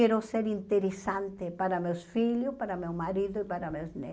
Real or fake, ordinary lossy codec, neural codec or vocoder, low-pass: real; none; none; none